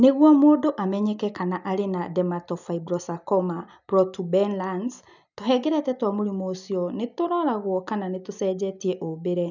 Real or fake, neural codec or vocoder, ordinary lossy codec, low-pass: real; none; none; 7.2 kHz